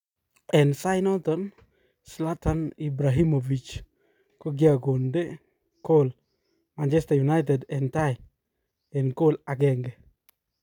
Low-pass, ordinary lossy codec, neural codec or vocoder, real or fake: 19.8 kHz; none; none; real